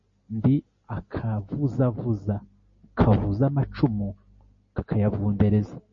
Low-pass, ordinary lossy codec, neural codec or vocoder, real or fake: 7.2 kHz; MP3, 32 kbps; none; real